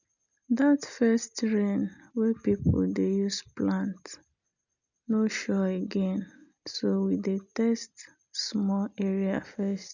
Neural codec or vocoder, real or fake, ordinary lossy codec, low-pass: none; real; none; 7.2 kHz